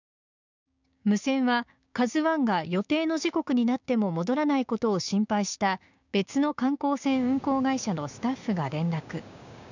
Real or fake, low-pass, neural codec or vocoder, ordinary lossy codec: fake; 7.2 kHz; codec, 16 kHz, 6 kbps, DAC; none